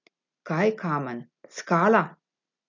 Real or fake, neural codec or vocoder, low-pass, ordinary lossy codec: real; none; 7.2 kHz; none